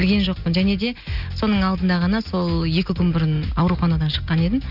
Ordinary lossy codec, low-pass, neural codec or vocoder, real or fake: none; 5.4 kHz; none; real